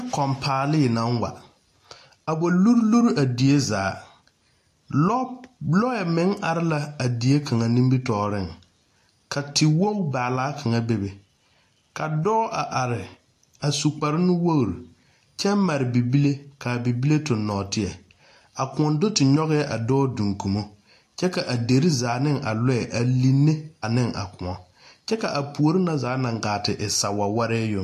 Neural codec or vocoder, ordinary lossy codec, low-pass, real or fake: none; AAC, 64 kbps; 14.4 kHz; real